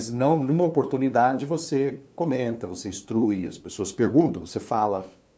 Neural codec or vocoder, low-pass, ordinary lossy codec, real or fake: codec, 16 kHz, 2 kbps, FunCodec, trained on LibriTTS, 25 frames a second; none; none; fake